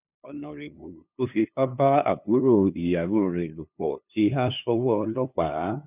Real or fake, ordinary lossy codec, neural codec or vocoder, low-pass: fake; none; codec, 16 kHz, 2 kbps, FunCodec, trained on LibriTTS, 25 frames a second; 3.6 kHz